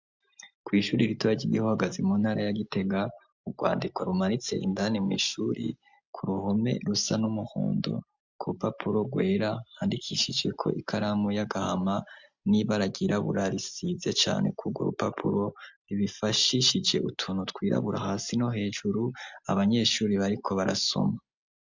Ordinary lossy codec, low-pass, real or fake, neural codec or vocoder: MP3, 64 kbps; 7.2 kHz; real; none